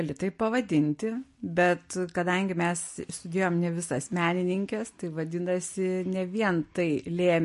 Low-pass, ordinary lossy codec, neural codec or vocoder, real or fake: 14.4 kHz; MP3, 48 kbps; none; real